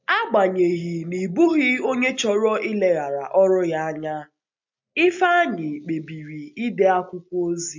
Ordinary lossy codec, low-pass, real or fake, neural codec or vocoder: MP3, 64 kbps; 7.2 kHz; real; none